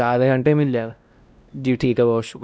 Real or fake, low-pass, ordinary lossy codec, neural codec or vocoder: fake; none; none; codec, 16 kHz, 1 kbps, X-Codec, WavLM features, trained on Multilingual LibriSpeech